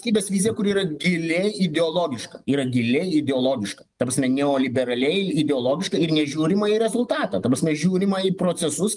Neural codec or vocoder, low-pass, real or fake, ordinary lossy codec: none; 10.8 kHz; real; Opus, 32 kbps